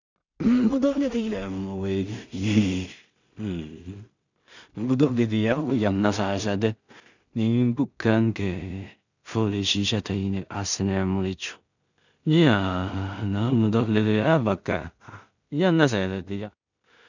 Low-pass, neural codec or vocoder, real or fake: 7.2 kHz; codec, 16 kHz in and 24 kHz out, 0.4 kbps, LongCat-Audio-Codec, two codebook decoder; fake